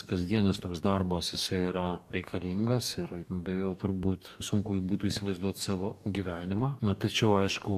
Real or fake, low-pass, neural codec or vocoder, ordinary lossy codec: fake; 14.4 kHz; codec, 44.1 kHz, 2.6 kbps, DAC; AAC, 96 kbps